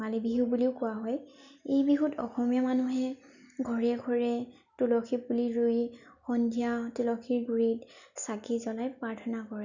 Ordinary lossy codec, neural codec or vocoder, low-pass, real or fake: none; none; 7.2 kHz; real